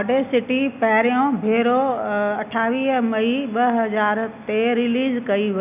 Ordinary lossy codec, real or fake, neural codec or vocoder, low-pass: none; real; none; 3.6 kHz